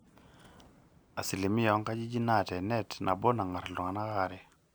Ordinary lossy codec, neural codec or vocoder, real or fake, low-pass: none; none; real; none